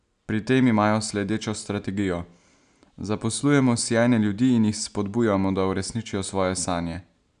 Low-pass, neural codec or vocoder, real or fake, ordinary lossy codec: 9.9 kHz; none; real; none